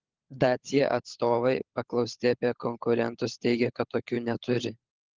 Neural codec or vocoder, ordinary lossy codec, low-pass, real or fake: codec, 16 kHz, 16 kbps, FunCodec, trained on LibriTTS, 50 frames a second; Opus, 16 kbps; 7.2 kHz; fake